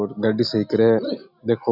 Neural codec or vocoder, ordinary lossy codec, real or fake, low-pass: none; none; real; 5.4 kHz